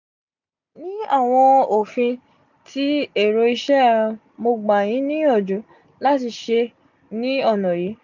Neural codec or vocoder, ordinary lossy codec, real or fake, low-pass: none; none; real; 7.2 kHz